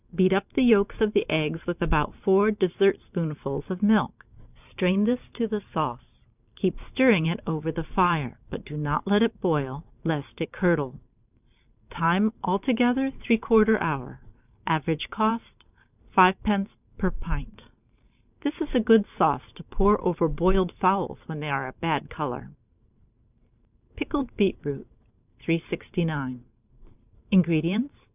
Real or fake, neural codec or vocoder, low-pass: fake; vocoder, 44.1 kHz, 128 mel bands every 512 samples, BigVGAN v2; 3.6 kHz